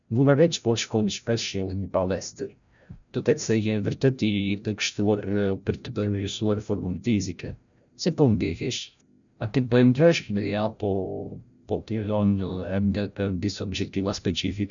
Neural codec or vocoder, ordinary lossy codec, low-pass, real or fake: codec, 16 kHz, 0.5 kbps, FreqCodec, larger model; none; 7.2 kHz; fake